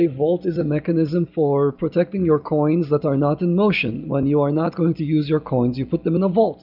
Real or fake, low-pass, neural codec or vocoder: real; 5.4 kHz; none